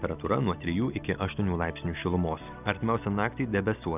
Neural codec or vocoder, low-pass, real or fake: none; 3.6 kHz; real